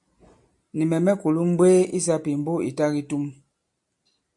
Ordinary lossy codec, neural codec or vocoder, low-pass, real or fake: MP3, 64 kbps; none; 10.8 kHz; real